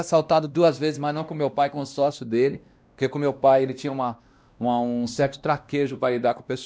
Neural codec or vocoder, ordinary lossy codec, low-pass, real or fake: codec, 16 kHz, 1 kbps, X-Codec, WavLM features, trained on Multilingual LibriSpeech; none; none; fake